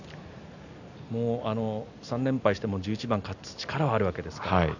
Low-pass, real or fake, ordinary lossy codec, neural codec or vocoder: 7.2 kHz; real; none; none